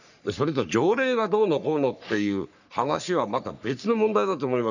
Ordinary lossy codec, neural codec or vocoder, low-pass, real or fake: none; codec, 44.1 kHz, 3.4 kbps, Pupu-Codec; 7.2 kHz; fake